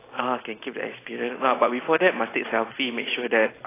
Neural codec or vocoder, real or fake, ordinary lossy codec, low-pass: none; real; AAC, 16 kbps; 3.6 kHz